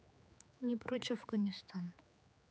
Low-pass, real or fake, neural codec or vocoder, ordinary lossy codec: none; fake; codec, 16 kHz, 4 kbps, X-Codec, HuBERT features, trained on general audio; none